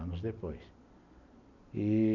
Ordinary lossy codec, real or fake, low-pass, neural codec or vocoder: none; real; 7.2 kHz; none